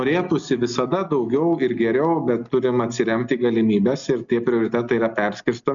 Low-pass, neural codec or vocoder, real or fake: 7.2 kHz; none; real